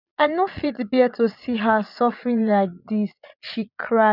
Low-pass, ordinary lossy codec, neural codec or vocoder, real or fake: 5.4 kHz; none; vocoder, 22.05 kHz, 80 mel bands, Vocos; fake